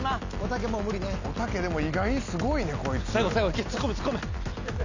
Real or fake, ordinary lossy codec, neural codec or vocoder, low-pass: real; none; none; 7.2 kHz